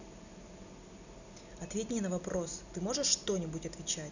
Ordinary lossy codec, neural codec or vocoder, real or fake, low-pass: none; none; real; 7.2 kHz